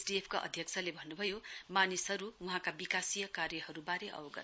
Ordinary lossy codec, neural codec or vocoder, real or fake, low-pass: none; none; real; none